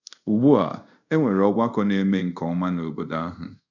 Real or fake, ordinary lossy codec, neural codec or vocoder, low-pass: fake; none; codec, 24 kHz, 0.5 kbps, DualCodec; 7.2 kHz